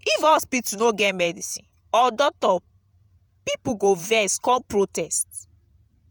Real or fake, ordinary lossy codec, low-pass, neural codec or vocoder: fake; none; none; vocoder, 48 kHz, 128 mel bands, Vocos